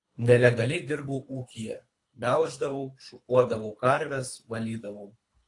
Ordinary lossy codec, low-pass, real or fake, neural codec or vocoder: AAC, 48 kbps; 10.8 kHz; fake; codec, 24 kHz, 3 kbps, HILCodec